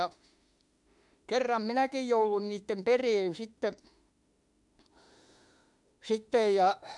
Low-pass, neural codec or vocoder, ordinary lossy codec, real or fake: 10.8 kHz; autoencoder, 48 kHz, 32 numbers a frame, DAC-VAE, trained on Japanese speech; MP3, 64 kbps; fake